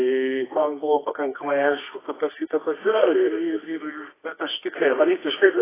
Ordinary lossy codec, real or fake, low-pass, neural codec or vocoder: AAC, 16 kbps; fake; 3.6 kHz; codec, 24 kHz, 0.9 kbps, WavTokenizer, medium music audio release